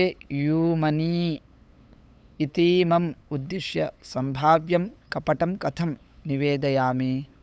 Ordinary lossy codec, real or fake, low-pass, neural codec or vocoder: none; fake; none; codec, 16 kHz, 8 kbps, FunCodec, trained on LibriTTS, 25 frames a second